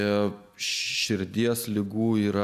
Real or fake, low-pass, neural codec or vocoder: real; 14.4 kHz; none